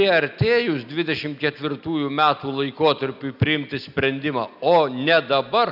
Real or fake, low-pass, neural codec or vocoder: real; 5.4 kHz; none